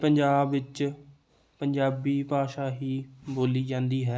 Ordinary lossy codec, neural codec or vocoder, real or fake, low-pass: none; none; real; none